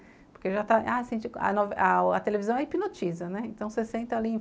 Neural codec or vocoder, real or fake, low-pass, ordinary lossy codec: none; real; none; none